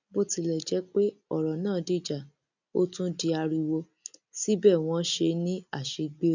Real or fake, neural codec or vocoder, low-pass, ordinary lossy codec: real; none; 7.2 kHz; none